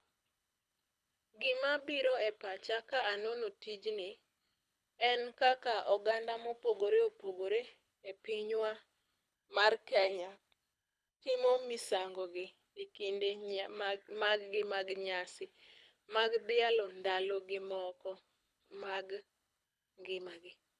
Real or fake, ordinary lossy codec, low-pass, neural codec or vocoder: fake; none; none; codec, 24 kHz, 6 kbps, HILCodec